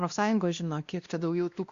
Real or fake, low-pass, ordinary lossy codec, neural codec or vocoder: fake; 7.2 kHz; Opus, 64 kbps; codec, 16 kHz, 1 kbps, X-Codec, WavLM features, trained on Multilingual LibriSpeech